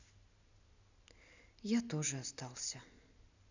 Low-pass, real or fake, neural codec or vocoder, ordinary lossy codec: 7.2 kHz; real; none; none